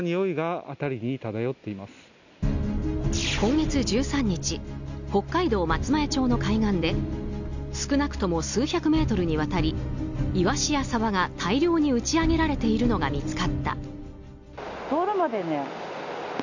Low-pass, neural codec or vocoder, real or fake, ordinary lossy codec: 7.2 kHz; none; real; none